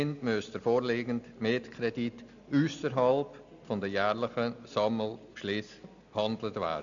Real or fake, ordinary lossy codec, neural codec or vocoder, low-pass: real; none; none; 7.2 kHz